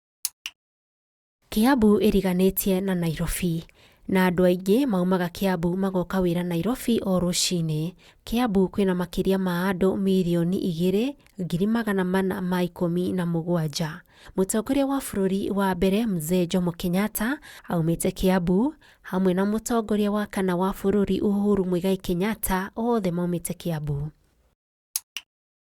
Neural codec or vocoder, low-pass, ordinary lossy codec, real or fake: none; 19.8 kHz; Opus, 64 kbps; real